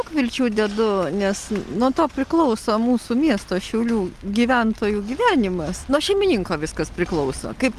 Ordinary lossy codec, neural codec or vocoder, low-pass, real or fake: Opus, 32 kbps; none; 14.4 kHz; real